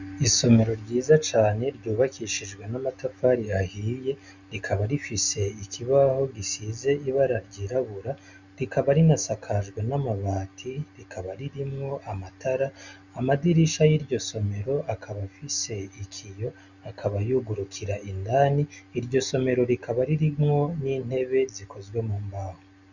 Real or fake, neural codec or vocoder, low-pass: real; none; 7.2 kHz